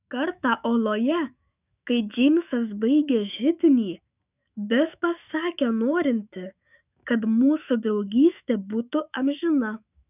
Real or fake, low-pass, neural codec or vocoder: fake; 3.6 kHz; autoencoder, 48 kHz, 128 numbers a frame, DAC-VAE, trained on Japanese speech